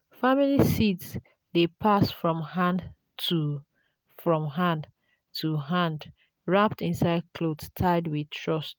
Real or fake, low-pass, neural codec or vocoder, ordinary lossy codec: real; none; none; none